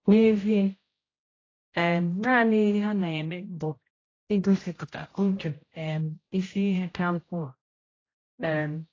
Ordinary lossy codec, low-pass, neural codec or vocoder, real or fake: AAC, 32 kbps; 7.2 kHz; codec, 16 kHz, 0.5 kbps, X-Codec, HuBERT features, trained on general audio; fake